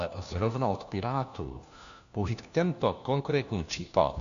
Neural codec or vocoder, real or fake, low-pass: codec, 16 kHz, 1 kbps, FunCodec, trained on LibriTTS, 50 frames a second; fake; 7.2 kHz